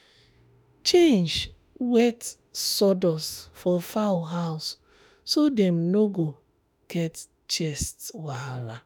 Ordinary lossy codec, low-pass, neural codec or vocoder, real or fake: none; none; autoencoder, 48 kHz, 32 numbers a frame, DAC-VAE, trained on Japanese speech; fake